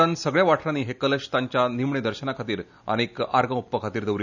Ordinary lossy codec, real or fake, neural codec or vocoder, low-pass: none; real; none; 7.2 kHz